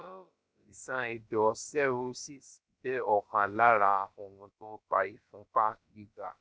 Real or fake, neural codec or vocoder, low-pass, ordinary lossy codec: fake; codec, 16 kHz, about 1 kbps, DyCAST, with the encoder's durations; none; none